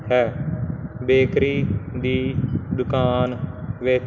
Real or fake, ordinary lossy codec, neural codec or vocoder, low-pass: real; none; none; 7.2 kHz